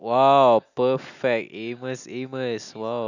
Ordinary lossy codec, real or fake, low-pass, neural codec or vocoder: none; real; 7.2 kHz; none